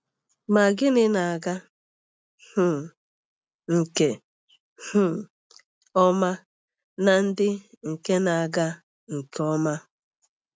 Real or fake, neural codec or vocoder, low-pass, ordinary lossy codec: real; none; none; none